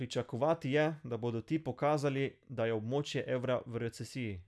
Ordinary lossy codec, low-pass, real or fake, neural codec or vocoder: none; none; real; none